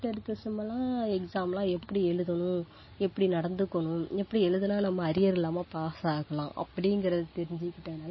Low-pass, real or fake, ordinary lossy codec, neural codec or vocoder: 7.2 kHz; real; MP3, 24 kbps; none